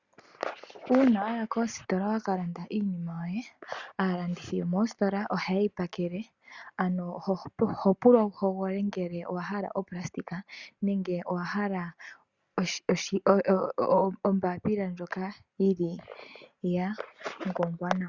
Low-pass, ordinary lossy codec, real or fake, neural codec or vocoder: 7.2 kHz; Opus, 64 kbps; real; none